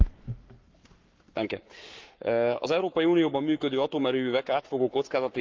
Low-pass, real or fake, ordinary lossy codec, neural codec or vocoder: 7.2 kHz; fake; Opus, 24 kbps; codec, 44.1 kHz, 7.8 kbps, Pupu-Codec